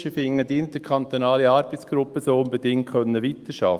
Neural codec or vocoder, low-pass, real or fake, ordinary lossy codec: autoencoder, 48 kHz, 128 numbers a frame, DAC-VAE, trained on Japanese speech; 14.4 kHz; fake; Opus, 32 kbps